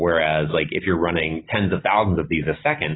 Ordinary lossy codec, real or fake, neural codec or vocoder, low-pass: AAC, 16 kbps; real; none; 7.2 kHz